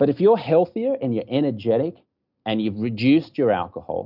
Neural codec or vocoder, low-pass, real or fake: none; 5.4 kHz; real